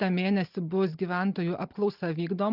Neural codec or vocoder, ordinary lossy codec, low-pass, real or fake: none; Opus, 24 kbps; 5.4 kHz; real